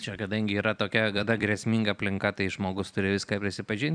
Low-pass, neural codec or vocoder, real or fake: 9.9 kHz; vocoder, 44.1 kHz, 128 mel bands every 256 samples, BigVGAN v2; fake